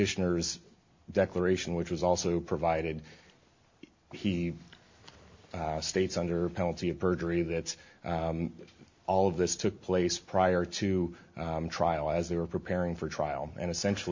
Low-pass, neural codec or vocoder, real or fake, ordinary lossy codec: 7.2 kHz; none; real; MP3, 48 kbps